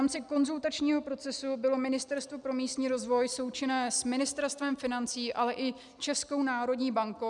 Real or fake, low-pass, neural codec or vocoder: real; 10.8 kHz; none